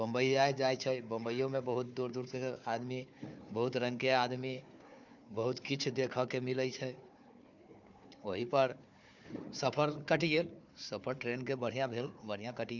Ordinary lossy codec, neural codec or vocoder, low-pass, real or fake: none; codec, 16 kHz, 4 kbps, FunCodec, trained on Chinese and English, 50 frames a second; 7.2 kHz; fake